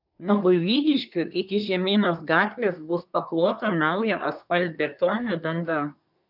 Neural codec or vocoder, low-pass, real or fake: codec, 24 kHz, 1 kbps, SNAC; 5.4 kHz; fake